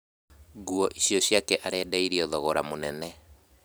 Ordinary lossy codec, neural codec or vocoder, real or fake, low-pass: none; vocoder, 44.1 kHz, 128 mel bands every 256 samples, BigVGAN v2; fake; none